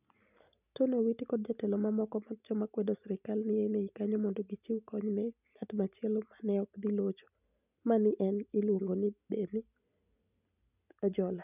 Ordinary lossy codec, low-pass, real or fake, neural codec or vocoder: none; 3.6 kHz; real; none